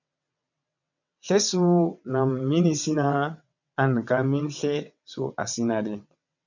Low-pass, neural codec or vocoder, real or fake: 7.2 kHz; vocoder, 22.05 kHz, 80 mel bands, WaveNeXt; fake